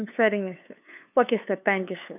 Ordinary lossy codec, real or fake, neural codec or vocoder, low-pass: none; fake; codec, 16 kHz, 2 kbps, FunCodec, trained on LibriTTS, 25 frames a second; 3.6 kHz